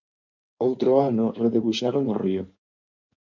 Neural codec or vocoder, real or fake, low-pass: codec, 16 kHz in and 24 kHz out, 2.2 kbps, FireRedTTS-2 codec; fake; 7.2 kHz